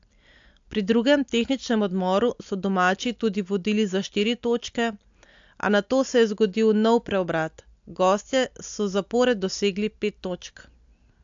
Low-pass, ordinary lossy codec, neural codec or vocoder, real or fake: 7.2 kHz; AAC, 64 kbps; none; real